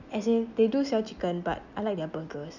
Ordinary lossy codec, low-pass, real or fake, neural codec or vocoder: none; 7.2 kHz; real; none